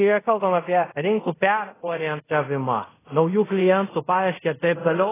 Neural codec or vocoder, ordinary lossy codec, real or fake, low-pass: codec, 24 kHz, 0.5 kbps, DualCodec; AAC, 16 kbps; fake; 3.6 kHz